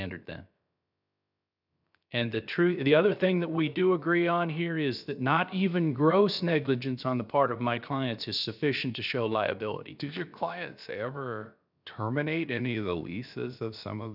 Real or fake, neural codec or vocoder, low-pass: fake; codec, 16 kHz, about 1 kbps, DyCAST, with the encoder's durations; 5.4 kHz